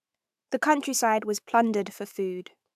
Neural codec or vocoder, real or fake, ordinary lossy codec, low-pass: autoencoder, 48 kHz, 128 numbers a frame, DAC-VAE, trained on Japanese speech; fake; AAC, 96 kbps; 14.4 kHz